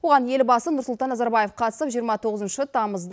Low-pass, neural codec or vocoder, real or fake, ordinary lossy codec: none; none; real; none